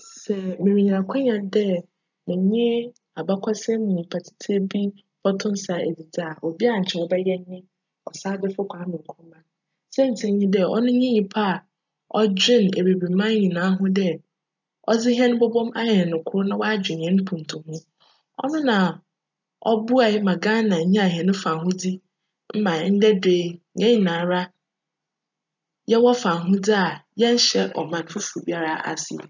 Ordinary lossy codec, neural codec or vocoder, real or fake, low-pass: none; none; real; 7.2 kHz